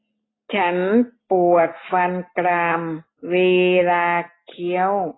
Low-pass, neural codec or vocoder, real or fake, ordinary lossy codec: 7.2 kHz; none; real; AAC, 16 kbps